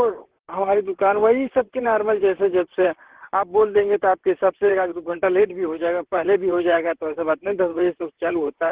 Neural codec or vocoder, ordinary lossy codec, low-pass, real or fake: vocoder, 44.1 kHz, 128 mel bands, Pupu-Vocoder; Opus, 16 kbps; 3.6 kHz; fake